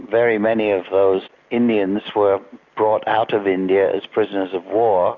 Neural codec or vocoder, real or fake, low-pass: none; real; 7.2 kHz